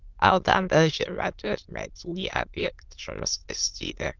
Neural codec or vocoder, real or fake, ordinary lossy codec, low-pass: autoencoder, 22.05 kHz, a latent of 192 numbers a frame, VITS, trained on many speakers; fake; Opus, 24 kbps; 7.2 kHz